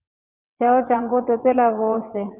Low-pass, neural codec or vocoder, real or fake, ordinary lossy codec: 3.6 kHz; vocoder, 22.05 kHz, 80 mel bands, WaveNeXt; fake; Opus, 64 kbps